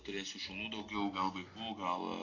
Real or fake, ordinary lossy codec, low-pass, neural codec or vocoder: real; AAC, 32 kbps; 7.2 kHz; none